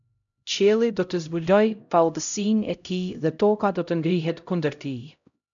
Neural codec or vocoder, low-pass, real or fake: codec, 16 kHz, 0.5 kbps, X-Codec, HuBERT features, trained on LibriSpeech; 7.2 kHz; fake